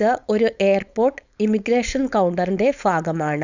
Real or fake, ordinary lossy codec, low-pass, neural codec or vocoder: fake; none; 7.2 kHz; codec, 16 kHz, 4.8 kbps, FACodec